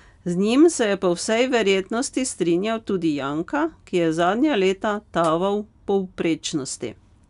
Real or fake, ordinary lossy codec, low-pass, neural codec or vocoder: real; none; 10.8 kHz; none